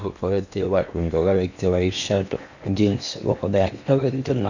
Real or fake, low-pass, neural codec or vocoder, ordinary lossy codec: fake; 7.2 kHz; codec, 16 kHz in and 24 kHz out, 0.8 kbps, FocalCodec, streaming, 65536 codes; none